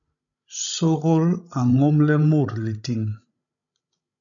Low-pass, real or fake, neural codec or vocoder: 7.2 kHz; fake; codec, 16 kHz, 16 kbps, FreqCodec, larger model